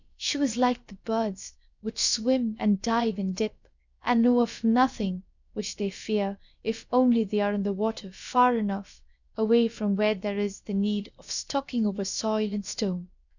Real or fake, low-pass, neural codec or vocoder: fake; 7.2 kHz; codec, 16 kHz, about 1 kbps, DyCAST, with the encoder's durations